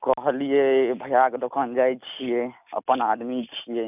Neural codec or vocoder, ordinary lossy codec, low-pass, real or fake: none; none; 3.6 kHz; real